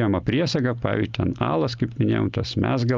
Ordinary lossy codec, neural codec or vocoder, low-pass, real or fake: Opus, 24 kbps; none; 7.2 kHz; real